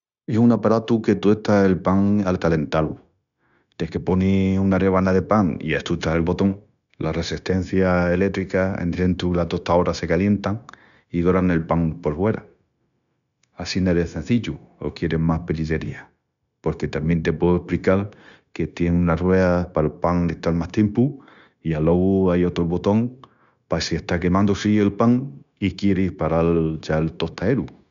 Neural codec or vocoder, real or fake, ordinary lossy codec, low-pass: codec, 16 kHz, 0.9 kbps, LongCat-Audio-Codec; fake; none; 7.2 kHz